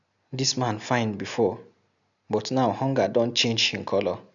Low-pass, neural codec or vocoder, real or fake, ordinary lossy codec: 7.2 kHz; none; real; none